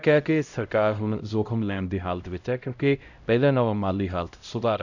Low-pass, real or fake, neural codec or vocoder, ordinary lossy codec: 7.2 kHz; fake; codec, 16 kHz, 0.5 kbps, X-Codec, HuBERT features, trained on LibriSpeech; none